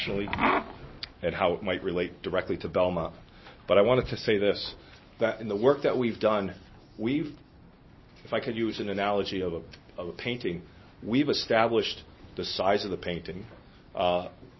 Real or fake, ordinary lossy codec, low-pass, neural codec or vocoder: real; MP3, 24 kbps; 7.2 kHz; none